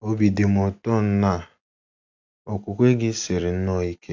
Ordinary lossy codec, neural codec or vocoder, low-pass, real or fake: AAC, 48 kbps; none; 7.2 kHz; real